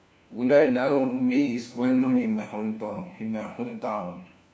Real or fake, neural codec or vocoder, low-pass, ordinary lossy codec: fake; codec, 16 kHz, 1 kbps, FunCodec, trained on LibriTTS, 50 frames a second; none; none